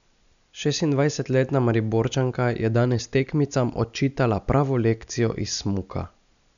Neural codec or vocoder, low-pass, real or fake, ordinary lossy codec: none; 7.2 kHz; real; none